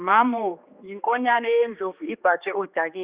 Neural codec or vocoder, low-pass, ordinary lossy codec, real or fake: codec, 16 kHz, 2 kbps, X-Codec, HuBERT features, trained on general audio; 3.6 kHz; Opus, 64 kbps; fake